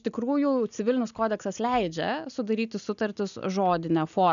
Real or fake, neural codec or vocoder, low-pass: real; none; 7.2 kHz